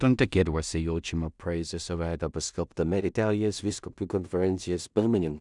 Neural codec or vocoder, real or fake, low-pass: codec, 16 kHz in and 24 kHz out, 0.4 kbps, LongCat-Audio-Codec, two codebook decoder; fake; 10.8 kHz